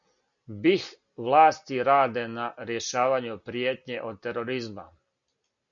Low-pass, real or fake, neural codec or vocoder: 7.2 kHz; real; none